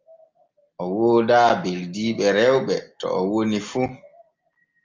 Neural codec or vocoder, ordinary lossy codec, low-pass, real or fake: none; Opus, 32 kbps; 7.2 kHz; real